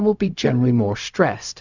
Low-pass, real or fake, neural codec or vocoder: 7.2 kHz; fake; codec, 16 kHz, 0.4 kbps, LongCat-Audio-Codec